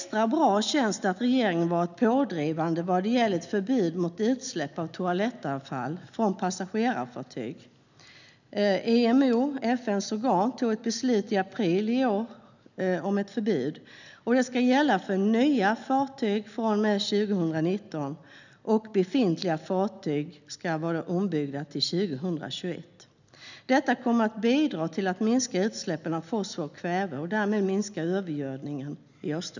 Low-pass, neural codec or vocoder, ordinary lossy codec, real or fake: 7.2 kHz; none; none; real